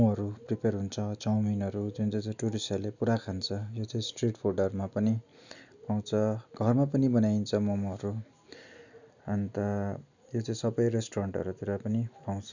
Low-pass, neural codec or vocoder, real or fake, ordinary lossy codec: 7.2 kHz; none; real; none